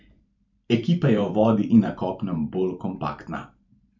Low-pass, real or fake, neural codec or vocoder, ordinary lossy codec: 7.2 kHz; fake; vocoder, 44.1 kHz, 128 mel bands every 512 samples, BigVGAN v2; none